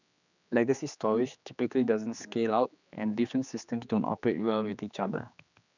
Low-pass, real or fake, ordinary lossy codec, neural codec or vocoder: 7.2 kHz; fake; none; codec, 16 kHz, 2 kbps, X-Codec, HuBERT features, trained on general audio